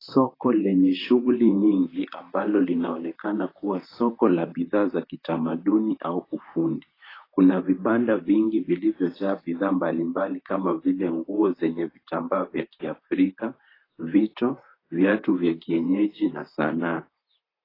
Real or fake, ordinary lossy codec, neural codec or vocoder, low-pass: fake; AAC, 24 kbps; vocoder, 44.1 kHz, 128 mel bands, Pupu-Vocoder; 5.4 kHz